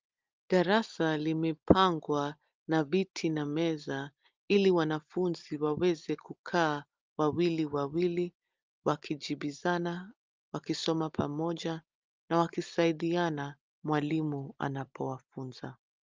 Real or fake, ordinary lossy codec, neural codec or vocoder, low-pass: real; Opus, 24 kbps; none; 7.2 kHz